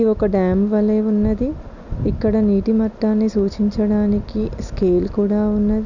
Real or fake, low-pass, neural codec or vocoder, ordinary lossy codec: real; 7.2 kHz; none; none